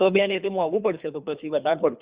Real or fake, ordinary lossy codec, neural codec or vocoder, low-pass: fake; Opus, 64 kbps; codec, 24 kHz, 3 kbps, HILCodec; 3.6 kHz